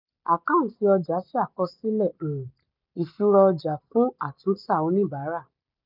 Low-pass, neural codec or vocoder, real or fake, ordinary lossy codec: 5.4 kHz; none; real; none